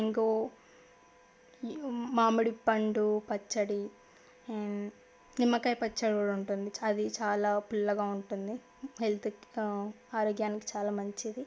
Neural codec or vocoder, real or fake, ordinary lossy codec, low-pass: none; real; none; none